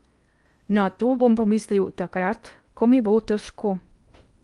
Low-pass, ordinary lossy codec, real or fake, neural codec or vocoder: 10.8 kHz; Opus, 32 kbps; fake; codec, 16 kHz in and 24 kHz out, 0.8 kbps, FocalCodec, streaming, 65536 codes